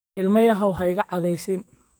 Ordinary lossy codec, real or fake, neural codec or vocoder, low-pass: none; fake; codec, 44.1 kHz, 2.6 kbps, SNAC; none